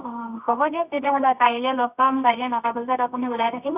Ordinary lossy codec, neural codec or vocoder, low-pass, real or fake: none; codec, 24 kHz, 0.9 kbps, WavTokenizer, medium music audio release; 3.6 kHz; fake